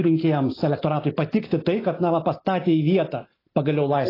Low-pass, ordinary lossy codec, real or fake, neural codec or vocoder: 5.4 kHz; AAC, 24 kbps; real; none